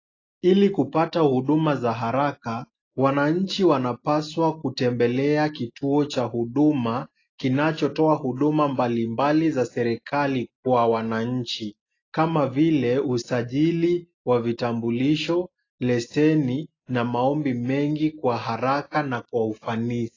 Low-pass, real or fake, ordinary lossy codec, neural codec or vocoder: 7.2 kHz; real; AAC, 32 kbps; none